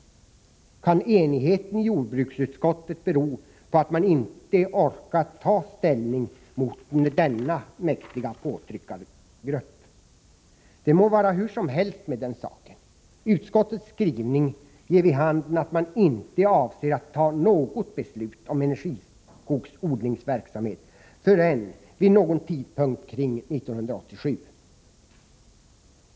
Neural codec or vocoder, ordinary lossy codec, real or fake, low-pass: none; none; real; none